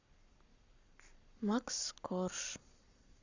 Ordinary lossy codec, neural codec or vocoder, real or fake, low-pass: Opus, 64 kbps; codec, 44.1 kHz, 7.8 kbps, Pupu-Codec; fake; 7.2 kHz